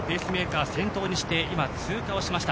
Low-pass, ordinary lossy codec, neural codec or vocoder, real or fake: none; none; none; real